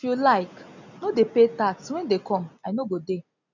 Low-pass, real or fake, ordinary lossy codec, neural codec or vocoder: 7.2 kHz; real; none; none